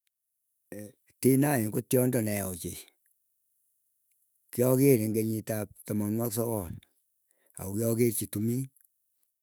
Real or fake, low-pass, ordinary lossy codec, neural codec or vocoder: fake; none; none; autoencoder, 48 kHz, 128 numbers a frame, DAC-VAE, trained on Japanese speech